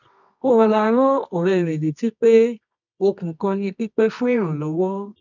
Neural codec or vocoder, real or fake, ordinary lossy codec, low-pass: codec, 24 kHz, 0.9 kbps, WavTokenizer, medium music audio release; fake; none; 7.2 kHz